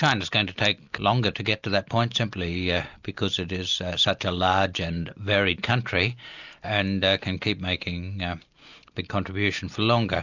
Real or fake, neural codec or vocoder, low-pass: real; none; 7.2 kHz